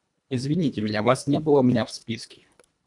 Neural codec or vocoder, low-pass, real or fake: codec, 24 kHz, 1.5 kbps, HILCodec; 10.8 kHz; fake